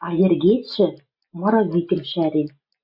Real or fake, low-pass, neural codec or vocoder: real; 5.4 kHz; none